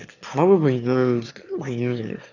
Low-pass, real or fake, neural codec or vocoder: 7.2 kHz; fake; autoencoder, 22.05 kHz, a latent of 192 numbers a frame, VITS, trained on one speaker